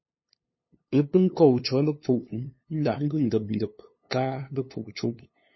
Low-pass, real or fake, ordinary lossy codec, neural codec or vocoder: 7.2 kHz; fake; MP3, 24 kbps; codec, 16 kHz, 2 kbps, FunCodec, trained on LibriTTS, 25 frames a second